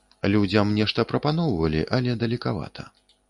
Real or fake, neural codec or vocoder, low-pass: real; none; 10.8 kHz